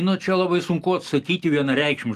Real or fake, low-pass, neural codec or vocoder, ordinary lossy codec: real; 14.4 kHz; none; Opus, 32 kbps